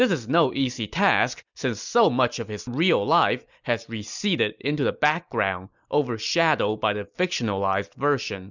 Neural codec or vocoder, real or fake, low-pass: none; real; 7.2 kHz